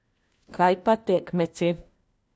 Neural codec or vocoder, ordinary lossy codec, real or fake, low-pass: codec, 16 kHz, 1 kbps, FunCodec, trained on LibriTTS, 50 frames a second; none; fake; none